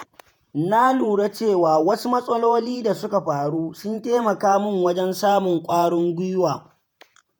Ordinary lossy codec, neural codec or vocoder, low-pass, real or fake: none; vocoder, 48 kHz, 128 mel bands, Vocos; none; fake